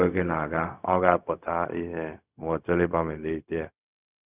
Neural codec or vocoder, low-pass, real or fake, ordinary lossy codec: codec, 16 kHz, 0.4 kbps, LongCat-Audio-Codec; 3.6 kHz; fake; none